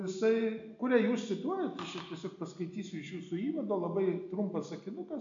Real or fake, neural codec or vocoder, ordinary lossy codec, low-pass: real; none; MP3, 64 kbps; 7.2 kHz